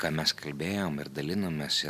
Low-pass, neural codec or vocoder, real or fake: 14.4 kHz; none; real